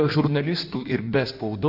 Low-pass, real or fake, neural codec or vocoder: 5.4 kHz; fake; codec, 16 kHz in and 24 kHz out, 1.1 kbps, FireRedTTS-2 codec